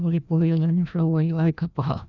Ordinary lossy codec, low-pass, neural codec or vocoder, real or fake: none; 7.2 kHz; codec, 16 kHz, 1 kbps, FreqCodec, larger model; fake